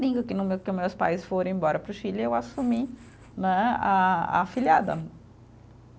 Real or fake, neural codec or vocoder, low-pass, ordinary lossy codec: real; none; none; none